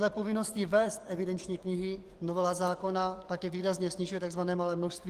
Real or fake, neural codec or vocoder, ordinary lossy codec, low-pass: fake; codec, 44.1 kHz, 7.8 kbps, DAC; Opus, 24 kbps; 14.4 kHz